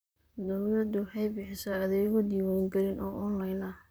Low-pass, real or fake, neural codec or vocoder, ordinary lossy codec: none; fake; vocoder, 44.1 kHz, 128 mel bands, Pupu-Vocoder; none